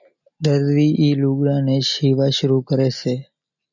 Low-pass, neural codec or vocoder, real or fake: 7.2 kHz; none; real